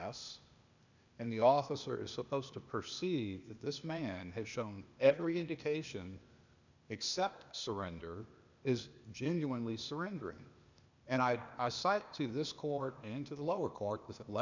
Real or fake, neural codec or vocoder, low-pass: fake; codec, 16 kHz, 0.8 kbps, ZipCodec; 7.2 kHz